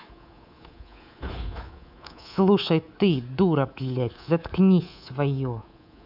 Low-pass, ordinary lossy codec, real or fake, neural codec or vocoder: 5.4 kHz; none; fake; codec, 24 kHz, 3.1 kbps, DualCodec